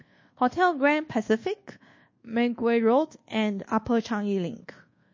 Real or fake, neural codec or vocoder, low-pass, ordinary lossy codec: fake; codec, 24 kHz, 1.2 kbps, DualCodec; 7.2 kHz; MP3, 32 kbps